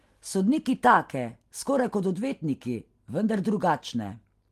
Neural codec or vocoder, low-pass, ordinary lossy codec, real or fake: none; 14.4 kHz; Opus, 24 kbps; real